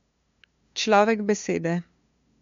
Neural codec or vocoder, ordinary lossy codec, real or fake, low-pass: codec, 16 kHz, 2 kbps, FunCodec, trained on LibriTTS, 25 frames a second; MP3, 64 kbps; fake; 7.2 kHz